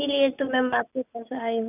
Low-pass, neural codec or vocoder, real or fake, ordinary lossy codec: 3.6 kHz; none; real; none